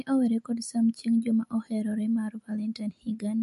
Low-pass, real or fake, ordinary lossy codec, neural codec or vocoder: 14.4 kHz; real; MP3, 48 kbps; none